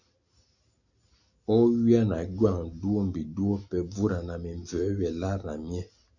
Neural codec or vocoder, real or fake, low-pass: none; real; 7.2 kHz